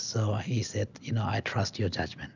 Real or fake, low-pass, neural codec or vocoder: real; 7.2 kHz; none